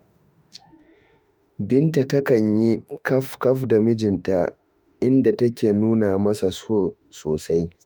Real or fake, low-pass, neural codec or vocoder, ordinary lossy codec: fake; none; autoencoder, 48 kHz, 32 numbers a frame, DAC-VAE, trained on Japanese speech; none